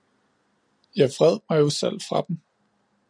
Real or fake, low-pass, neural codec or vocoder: real; 9.9 kHz; none